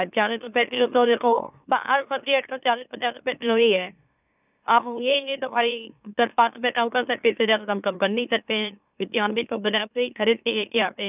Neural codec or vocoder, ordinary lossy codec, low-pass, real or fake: autoencoder, 44.1 kHz, a latent of 192 numbers a frame, MeloTTS; none; 3.6 kHz; fake